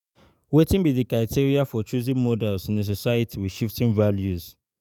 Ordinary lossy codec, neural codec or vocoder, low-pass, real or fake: none; codec, 44.1 kHz, 7.8 kbps, DAC; 19.8 kHz; fake